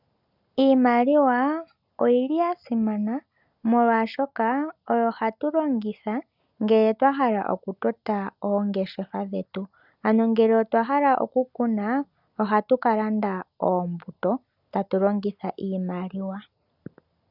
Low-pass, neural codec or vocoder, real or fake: 5.4 kHz; none; real